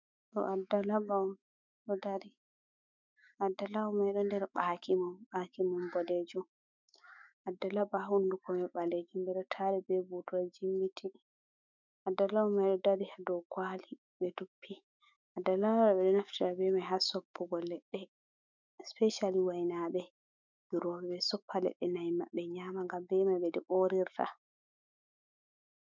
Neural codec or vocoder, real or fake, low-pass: autoencoder, 48 kHz, 128 numbers a frame, DAC-VAE, trained on Japanese speech; fake; 7.2 kHz